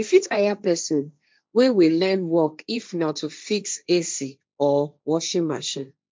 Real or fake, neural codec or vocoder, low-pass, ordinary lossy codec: fake; codec, 16 kHz, 1.1 kbps, Voila-Tokenizer; none; none